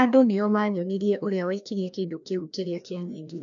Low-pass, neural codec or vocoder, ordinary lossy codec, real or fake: 7.2 kHz; codec, 16 kHz, 1 kbps, FreqCodec, larger model; none; fake